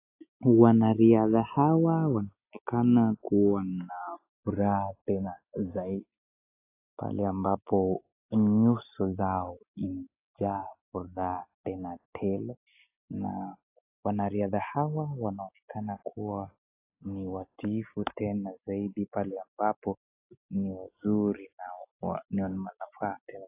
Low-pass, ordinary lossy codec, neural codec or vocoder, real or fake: 3.6 kHz; AAC, 24 kbps; none; real